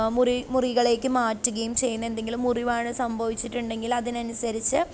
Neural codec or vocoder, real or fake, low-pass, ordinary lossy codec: none; real; none; none